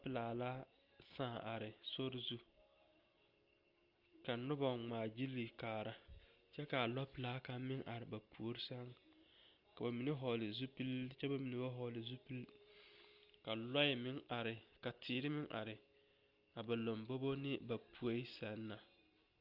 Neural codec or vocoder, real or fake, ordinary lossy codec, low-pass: none; real; Opus, 32 kbps; 5.4 kHz